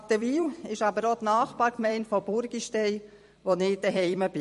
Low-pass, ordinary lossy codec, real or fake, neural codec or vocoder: 14.4 kHz; MP3, 48 kbps; fake; vocoder, 44.1 kHz, 128 mel bands every 256 samples, BigVGAN v2